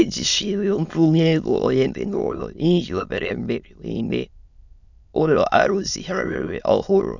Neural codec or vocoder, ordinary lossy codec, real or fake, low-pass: autoencoder, 22.05 kHz, a latent of 192 numbers a frame, VITS, trained on many speakers; none; fake; 7.2 kHz